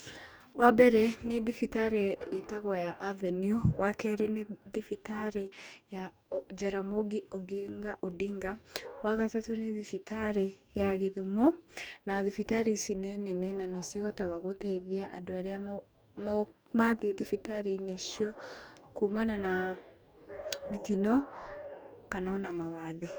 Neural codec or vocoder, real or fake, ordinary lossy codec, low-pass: codec, 44.1 kHz, 2.6 kbps, DAC; fake; none; none